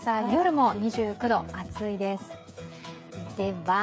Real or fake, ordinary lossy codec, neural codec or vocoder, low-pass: fake; none; codec, 16 kHz, 8 kbps, FreqCodec, smaller model; none